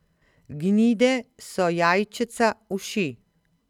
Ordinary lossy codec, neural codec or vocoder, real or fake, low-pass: none; none; real; 19.8 kHz